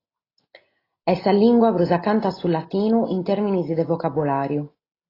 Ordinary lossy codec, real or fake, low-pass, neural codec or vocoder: AAC, 24 kbps; real; 5.4 kHz; none